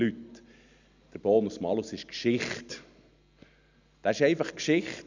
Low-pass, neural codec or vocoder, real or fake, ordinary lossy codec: 7.2 kHz; none; real; none